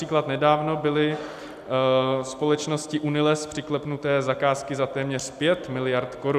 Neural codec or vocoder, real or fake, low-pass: none; real; 14.4 kHz